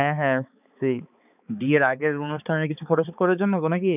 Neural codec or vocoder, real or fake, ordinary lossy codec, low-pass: codec, 16 kHz, 4 kbps, X-Codec, HuBERT features, trained on balanced general audio; fake; none; 3.6 kHz